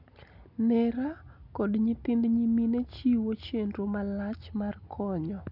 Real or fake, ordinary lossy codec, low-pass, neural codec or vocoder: real; none; 5.4 kHz; none